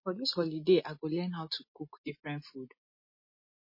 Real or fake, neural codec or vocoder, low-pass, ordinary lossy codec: real; none; 5.4 kHz; MP3, 24 kbps